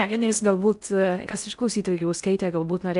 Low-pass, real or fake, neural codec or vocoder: 10.8 kHz; fake; codec, 16 kHz in and 24 kHz out, 0.6 kbps, FocalCodec, streaming, 4096 codes